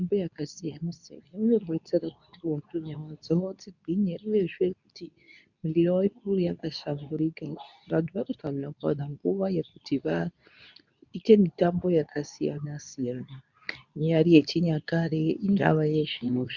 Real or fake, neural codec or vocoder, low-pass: fake; codec, 24 kHz, 0.9 kbps, WavTokenizer, medium speech release version 2; 7.2 kHz